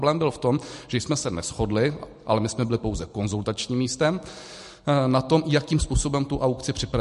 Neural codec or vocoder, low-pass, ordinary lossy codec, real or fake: none; 14.4 kHz; MP3, 48 kbps; real